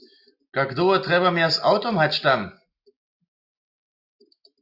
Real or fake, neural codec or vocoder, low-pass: real; none; 5.4 kHz